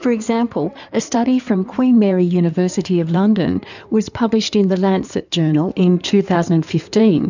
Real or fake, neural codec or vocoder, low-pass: fake; codec, 16 kHz in and 24 kHz out, 2.2 kbps, FireRedTTS-2 codec; 7.2 kHz